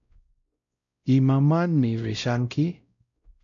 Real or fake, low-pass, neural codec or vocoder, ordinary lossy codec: fake; 7.2 kHz; codec, 16 kHz, 0.5 kbps, X-Codec, WavLM features, trained on Multilingual LibriSpeech; AAC, 64 kbps